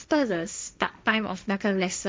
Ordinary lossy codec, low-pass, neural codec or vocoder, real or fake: none; none; codec, 16 kHz, 1.1 kbps, Voila-Tokenizer; fake